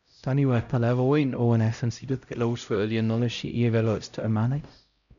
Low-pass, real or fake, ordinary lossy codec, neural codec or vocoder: 7.2 kHz; fake; none; codec, 16 kHz, 0.5 kbps, X-Codec, HuBERT features, trained on LibriSpeech